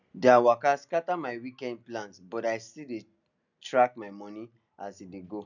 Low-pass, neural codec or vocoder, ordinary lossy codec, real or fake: 7.2 kHz; none; none; real